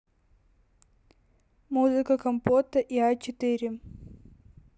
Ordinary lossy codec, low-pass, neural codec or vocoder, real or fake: none; none; none; real